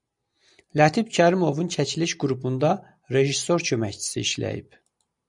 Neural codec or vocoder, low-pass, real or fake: none; 10.8 kHz; real